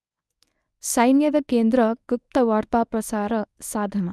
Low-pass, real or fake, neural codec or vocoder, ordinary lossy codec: none; fake; codec, 24 kHz, 0.9 kbps, WavTokenizer, medium speech release version 1; none